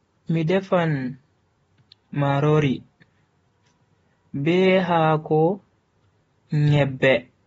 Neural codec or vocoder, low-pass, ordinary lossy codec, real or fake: none; 19.8 kHz; AAC, 24 kbps; real